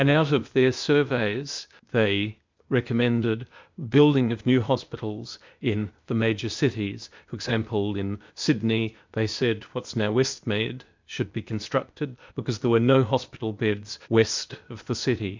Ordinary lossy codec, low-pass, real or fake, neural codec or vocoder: MP3, 64 kbps; 7.2 kHz; fake; codec, 16 kHz, 0.8 kbps, ZipCodec